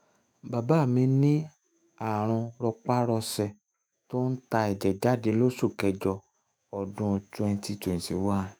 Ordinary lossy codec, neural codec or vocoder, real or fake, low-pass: none; autoencoder, 48 kHz, 128 numbers a frame, DAC-VAE, trained on Japanese speech; fake; none